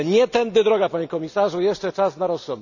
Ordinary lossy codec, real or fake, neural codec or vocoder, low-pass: none; real; none; 7.2 kHz